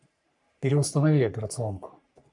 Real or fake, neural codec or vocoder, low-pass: fake; codec, 44.1 kHz, 3.4 kbps, Pupu-Codec; 10.8 kHz